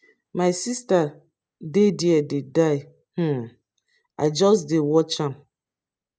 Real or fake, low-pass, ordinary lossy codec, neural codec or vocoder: real; none; none; none